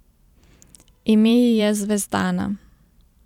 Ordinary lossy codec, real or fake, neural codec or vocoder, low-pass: none; fake; vocoder, 44.1 kHz, 128 mel bands every 512 samples, BigVGAN v2; 19.8 kHz